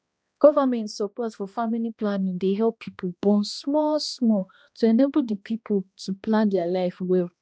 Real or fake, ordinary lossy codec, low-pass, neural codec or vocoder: fake; none; none; codec, 16 kHz, 1 kbps, X-Codec, HuBERT features, trained on balanced general audio